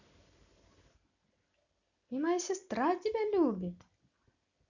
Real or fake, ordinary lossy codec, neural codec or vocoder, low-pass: real; none; none; 7.2 kHz